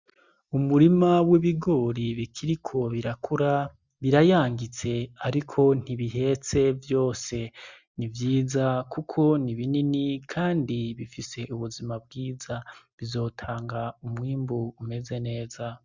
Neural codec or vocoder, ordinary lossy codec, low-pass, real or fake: none; Opus, 64 kbps; 7.2 kHz; real